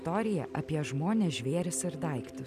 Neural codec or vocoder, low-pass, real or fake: none; 14.4 kHz; real